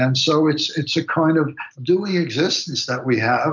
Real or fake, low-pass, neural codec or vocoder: real; 7.2 kHz; none